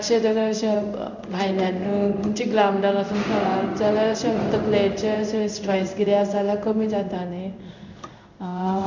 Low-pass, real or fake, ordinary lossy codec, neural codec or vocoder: 7.2 kHz; fake; none; codec, 16 kHz in and 24 kHz out, 1 kbps, XY-Tokenizer